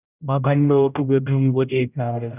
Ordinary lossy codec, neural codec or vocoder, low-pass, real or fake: none; codec, 16 kHz, 0.5 kbps, X-Codec, HuBERT features, trained on general audio; 3.6 kHz; fake